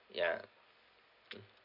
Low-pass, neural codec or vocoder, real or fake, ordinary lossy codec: 5.4 kHz; none; real; none